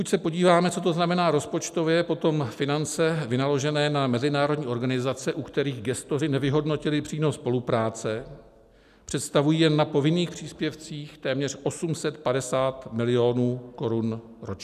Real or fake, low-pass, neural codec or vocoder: real; 14.4 kHz; none